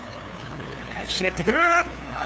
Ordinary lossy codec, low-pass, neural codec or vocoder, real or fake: none; none; codec, 16 kHz, 2 kbps, FunCodec, trained on LibriTTS, 25 frames a second; fake